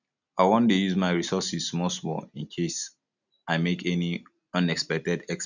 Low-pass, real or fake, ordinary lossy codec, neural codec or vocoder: 7.2 kHz; real; none; none